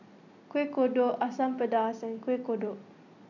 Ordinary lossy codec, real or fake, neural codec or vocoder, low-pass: none; real; none; 7.2 kHz